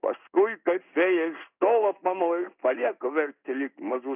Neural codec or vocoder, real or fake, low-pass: codec, 16 kHz in and 24 kHz out, 1 kbps, XY-Tokenizer; fake; 3.6 kHz